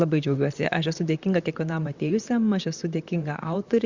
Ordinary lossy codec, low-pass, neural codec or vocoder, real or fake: Opus, 64 kbps; 7.2 kHz; vocoder, 44.1 kHz, 128 mel bands, Pupu-Vocoder; fake